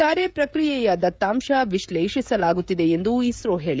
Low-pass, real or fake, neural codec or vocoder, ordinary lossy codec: none; fake; codec, 16 kHz, 16 kbps, FreqCodec, smaller model; none